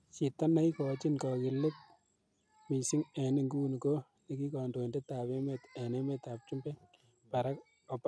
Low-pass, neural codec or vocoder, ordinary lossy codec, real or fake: none; none; none; real